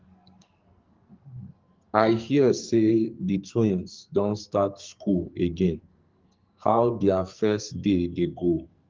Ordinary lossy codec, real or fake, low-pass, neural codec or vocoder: Opus, 24 kbps; fake; 7.2 kHz; codec, 44.1 kHz, 2.6 kbps, SNAC